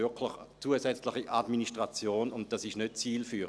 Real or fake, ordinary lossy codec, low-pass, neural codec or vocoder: real; none; none; none